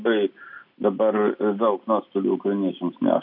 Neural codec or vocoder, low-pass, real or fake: none; 5.4 kHz; real